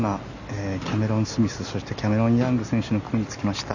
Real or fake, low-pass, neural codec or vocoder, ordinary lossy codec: real; 7.2 kHz; none; none